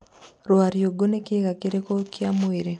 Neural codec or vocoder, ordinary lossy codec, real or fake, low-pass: none; none; real; 10.8 kHz